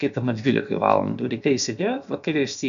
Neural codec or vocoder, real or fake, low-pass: codec, 16 kHz, about 1 kbps, DyCAST, with the encoder's durations; fake; 7.2 kHz